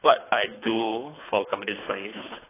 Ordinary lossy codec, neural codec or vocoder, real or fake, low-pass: AAC, 16 kbps; codec, 24 kHz, 3 kbps, HILCodec; fake; 3.6 kHz